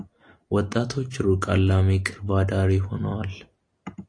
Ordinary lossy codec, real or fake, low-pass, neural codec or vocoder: AAC, 48 kbps; real; 9.9 kHz; none